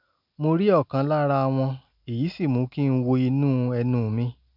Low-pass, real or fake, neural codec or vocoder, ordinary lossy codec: 5.4 kHz; real; none; none